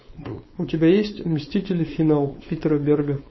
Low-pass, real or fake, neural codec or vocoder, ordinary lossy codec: 7.2 kHz; fake; codec, 16 kHz, 4.8 kbps, FACodec; MP3, 24 kbps